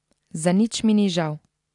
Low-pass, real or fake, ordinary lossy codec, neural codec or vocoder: 10.8 kHz; real; AAC, 64 kbps; none